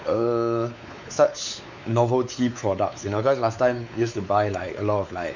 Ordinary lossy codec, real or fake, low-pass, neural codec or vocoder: none; fake; 7.2 kHz; codec, 16 kHz, 4 kbps, X-Codec, WavLM features, trained on Multilingual LibriSpeech